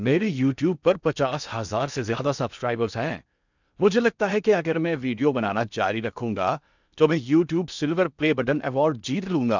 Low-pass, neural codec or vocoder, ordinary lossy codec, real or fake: 7.2 kHz; codec, 16 kHz in and 24 kHz out, 0.8 kbps, FocalCodec, streaming, 65536 codes; none; fake